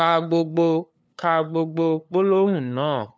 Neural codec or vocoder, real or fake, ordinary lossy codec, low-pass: codec, 16 kHz, 2 kbps, FunCodec, trained on LibriTTS, 25 frames a second; fake; none; none